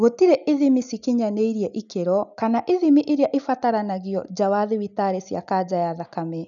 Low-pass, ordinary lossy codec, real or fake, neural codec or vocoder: 7.2 kHz; none; real; none